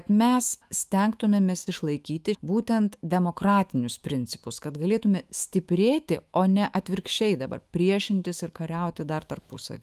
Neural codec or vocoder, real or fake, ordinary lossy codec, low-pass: autoencoder, 48 kHz, 128 numbers a frame, DAC-VAE, trained on Japanese speech; fake; Opus, 32 kbps; 14.4 kHz